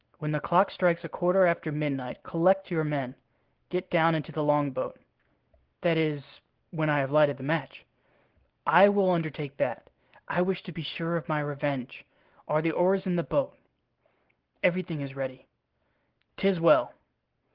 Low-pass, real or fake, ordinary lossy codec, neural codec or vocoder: 5.4 kHz; real; Opus, 16 kbps; none